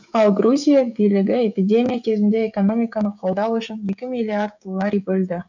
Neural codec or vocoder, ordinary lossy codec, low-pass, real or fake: codec, 16 kHz, 16 kbps, FreqCodec, smaller model; none; 7.2 kHz; fake